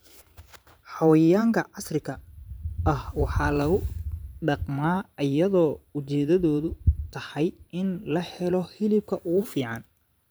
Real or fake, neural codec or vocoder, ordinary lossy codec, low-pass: fake; vocoder, 44.1 kHz, 128 mel bands every 512 samples, BigVGAN v2; none; none